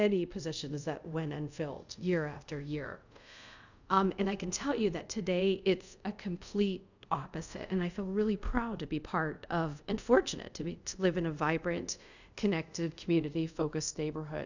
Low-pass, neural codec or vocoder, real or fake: 7.2 kHz; codec, 24 kHz, 0.5 kbps, DualCodec; fake